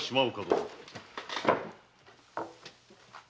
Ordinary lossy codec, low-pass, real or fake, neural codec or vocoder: none; none; real; none